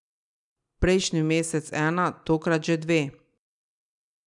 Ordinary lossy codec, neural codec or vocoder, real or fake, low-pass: none; none; real; 10.8 kHz